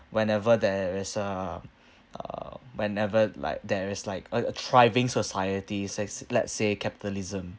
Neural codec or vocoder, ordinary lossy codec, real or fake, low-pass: none; none; real; none